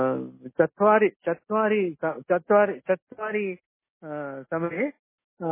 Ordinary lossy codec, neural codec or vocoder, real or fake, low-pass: MP3, 16 kbps; none; real; 3.6 kHz